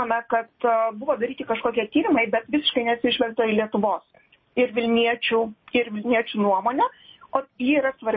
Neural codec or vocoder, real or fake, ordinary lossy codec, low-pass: none; real; MP3, 24 kbps; 7.2 kHz